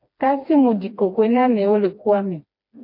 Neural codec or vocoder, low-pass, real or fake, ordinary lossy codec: codec, 16 kHz, 2 kbps, FreqCodec, smaller model; 5.4 kHz; fake; MP3, 32 kbps